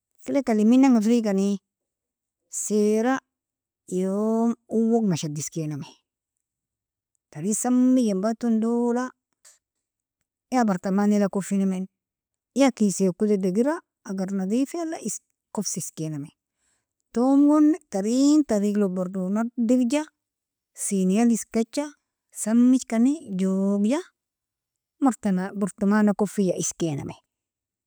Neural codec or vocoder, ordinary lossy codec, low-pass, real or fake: none; none; none; real